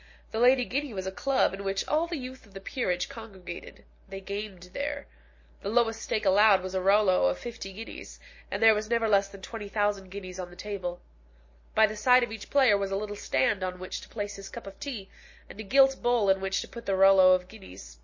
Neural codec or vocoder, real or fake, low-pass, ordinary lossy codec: none; real; 7.2 kHz; MP3, 32 kbps